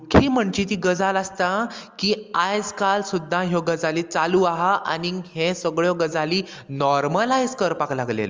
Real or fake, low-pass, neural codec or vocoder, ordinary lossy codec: real; 7.2 kHz; none; Opus, 32 kbps